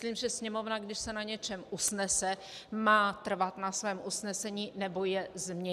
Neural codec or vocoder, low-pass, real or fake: none; 14.4 kHz; real